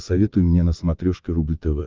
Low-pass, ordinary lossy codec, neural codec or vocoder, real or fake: 7.2 kHz; Opus, 32 kbps; vocoder, 22.05 kHz, 80 mel bands, WaveNeXt; fake